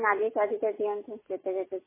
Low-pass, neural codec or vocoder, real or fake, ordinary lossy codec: 3.6 kHz; none; real; MP3, 16 kbps